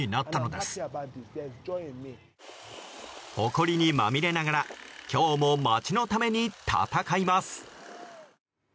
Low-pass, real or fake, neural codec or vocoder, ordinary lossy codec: none; real; none; none